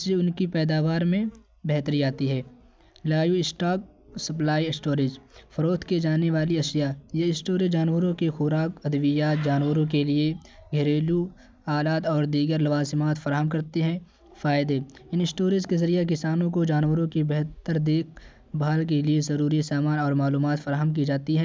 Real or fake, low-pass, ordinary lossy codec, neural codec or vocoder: real; none; none; none